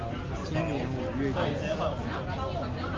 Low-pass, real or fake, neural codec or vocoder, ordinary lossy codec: 7.2 kHz; real; none; Opus, 24 kbps